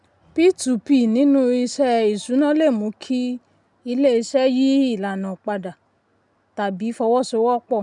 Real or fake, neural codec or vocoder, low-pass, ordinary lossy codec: real; none; 10.8 kHz; none